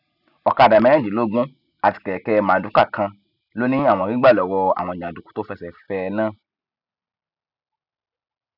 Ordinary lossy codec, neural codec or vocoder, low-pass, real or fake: none; none; 5.4 kHz; real